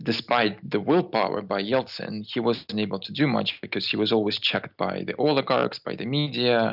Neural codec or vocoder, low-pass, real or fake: none; 5.4 kHz; real